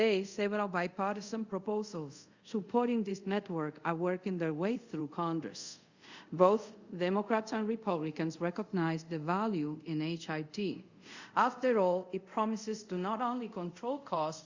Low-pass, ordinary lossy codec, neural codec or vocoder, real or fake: 7.2 kHz; Opus, 64 kbps; codec, 24 kHz, 0.5 kbps, DualCodec; fake